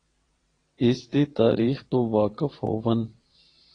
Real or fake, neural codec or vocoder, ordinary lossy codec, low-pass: fake; vocoder, 22.05 kHz, 80 mel bands, WaveNeXt; AAC, 32 kbps; 9.9 kHz